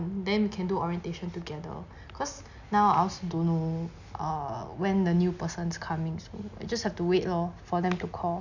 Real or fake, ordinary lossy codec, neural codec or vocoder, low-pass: real; none; none; 7.2 kHz